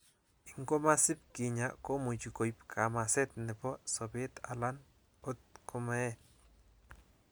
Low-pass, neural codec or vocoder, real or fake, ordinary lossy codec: none; none; real; none